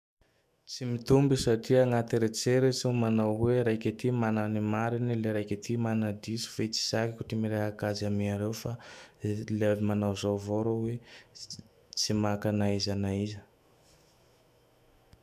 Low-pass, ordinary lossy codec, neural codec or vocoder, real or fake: 14.4 kHz; none; autoencoder, 48 kHz, 128 numbers a frame, DAC-VAE, trained on Japanese speech; fake